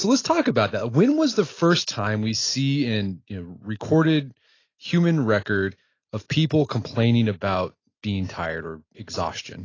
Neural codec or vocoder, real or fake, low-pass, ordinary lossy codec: none; real; 7.2 kHz; AAC, 32 kbps